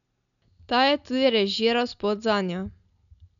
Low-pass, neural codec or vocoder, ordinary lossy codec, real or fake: 7.2 kHz; none; none; real